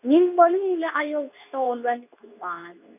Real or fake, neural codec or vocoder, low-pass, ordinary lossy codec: fake; codec, 24 kHz, 0.9 kbps, WavTokenizer, medium speech release version 2; 3.6 kHz; none